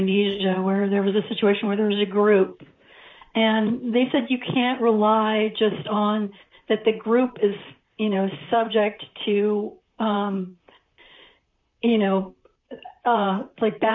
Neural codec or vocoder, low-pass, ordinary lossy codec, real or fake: vocoder, 44.1 kHz, 128 mel bands, Pupu-Vocoder; 7.2 kHz; MP3, 48 kbps; fake